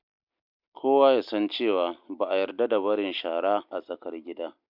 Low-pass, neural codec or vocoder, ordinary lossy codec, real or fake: 5.4 kHz; none; MP3, 48 kbps; real